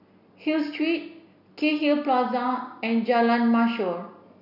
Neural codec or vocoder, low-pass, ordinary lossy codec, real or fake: none; 5.4 kHz; none; real